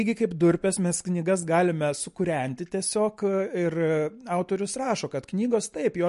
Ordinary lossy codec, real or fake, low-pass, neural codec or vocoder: MP3, 48 kbps; real; 14.4 kHz; none